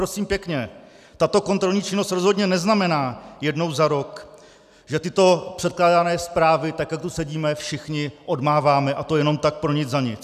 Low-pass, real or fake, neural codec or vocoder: 14.4 kHz; real; none